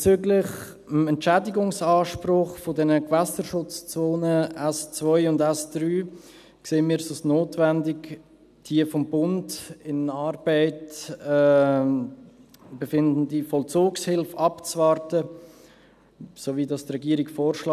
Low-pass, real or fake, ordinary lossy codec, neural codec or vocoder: 14.4 kHz; real; none; none